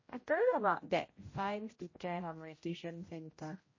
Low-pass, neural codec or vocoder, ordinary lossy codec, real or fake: 7.2 kHz; codec, 16 kHz, 0.5 kbps, X-Codec, HuBERT features, trained on general audio; MP3, 32 kbps; fake